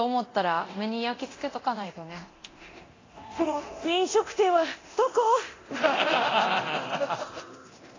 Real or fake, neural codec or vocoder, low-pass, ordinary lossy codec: fake; codec, 24 kHz, 0.9 kbps, DualCodec; 7.2 kHz; AAC, 32 kbps